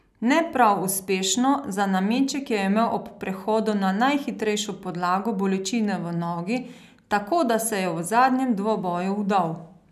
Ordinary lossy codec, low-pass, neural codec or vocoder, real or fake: none; 14.4 kHz; none; real